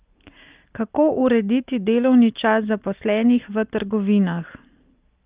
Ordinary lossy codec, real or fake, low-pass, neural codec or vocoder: Opus, 32 kbps; real; 3.6 kHz; none